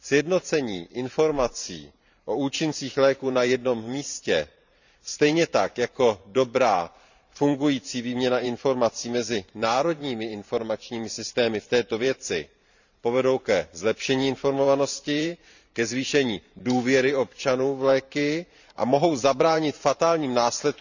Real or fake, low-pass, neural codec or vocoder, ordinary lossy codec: fake; 7.2 kHz; vocoder, 44.1 kHz, 128 mel bands every 512 samples, BigVGAN v2; none